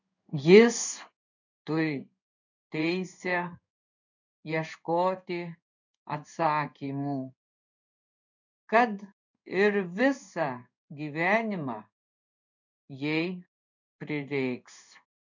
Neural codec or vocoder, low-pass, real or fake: codec, 16 kHz in and 24 kHz out, 1 kbps, XY-Tokenizer; 7.2 kHz; fake